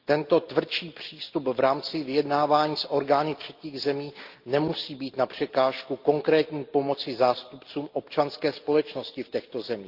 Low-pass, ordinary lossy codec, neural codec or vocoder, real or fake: 5.4 kHz; Opus, 32 kbps; none; real